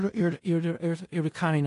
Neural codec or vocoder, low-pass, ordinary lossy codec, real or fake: codec, 16 kHz in and 24 kHz out, 0.4 kbps, LongCat-Audio-Codec, four codebook decoder; 10.8 kHz; AAC, 48 kbps; fake